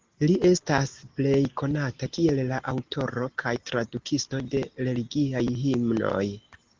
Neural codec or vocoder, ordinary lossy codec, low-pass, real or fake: none; Opus, 16 kbps; 7.2 kHz; real